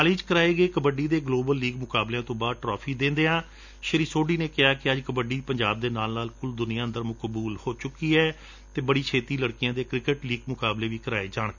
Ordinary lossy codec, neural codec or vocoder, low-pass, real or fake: none; none; 7.2 kHz; real